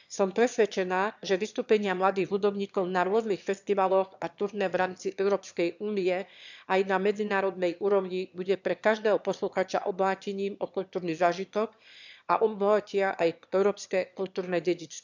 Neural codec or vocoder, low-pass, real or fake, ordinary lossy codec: autoencoder, 22.05 kHz, a latent of 192 numbers a frame, VITS, trained on one speaker; 7.2 kHz; fake; none